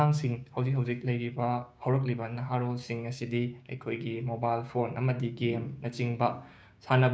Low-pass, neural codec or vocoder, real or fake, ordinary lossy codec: none; codec, 16 kHz, 6 kbps, DAC; fake; none